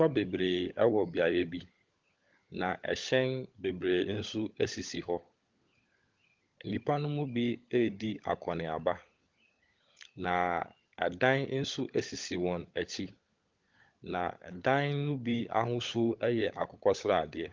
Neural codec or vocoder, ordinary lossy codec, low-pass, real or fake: codec, 16 kHz, 16 kbps, FunCodec, trained on LibriTTS, 50 frames a second; Opus, 32 kbps; 7.2 kHz; fake